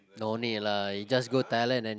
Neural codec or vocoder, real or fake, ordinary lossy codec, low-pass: none; real; none; none